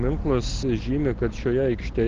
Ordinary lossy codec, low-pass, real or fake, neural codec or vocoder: Opus, 16 kbps; 7.2 kHz; real; none